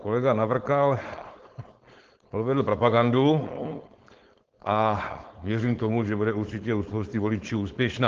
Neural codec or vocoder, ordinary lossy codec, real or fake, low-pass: codec, 16 kHz, 4.8 kbps, FACodec; Opus, 16 kbps; fake; 7.2 kHz